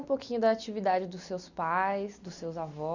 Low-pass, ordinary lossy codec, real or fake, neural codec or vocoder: 7.2 kHz; none; real; none